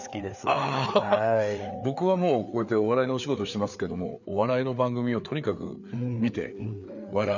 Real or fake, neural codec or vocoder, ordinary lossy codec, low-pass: fake; codec, 16 kHz, 4 kbps, FreqCodec, larger model; none; 7.2 kHz